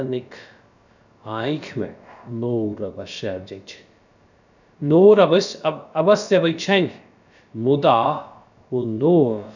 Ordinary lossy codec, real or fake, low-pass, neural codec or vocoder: none; fake; 7.2 kHz; codec, 16 kHz, about 1 kbps, DyCAST, with the encoder's durations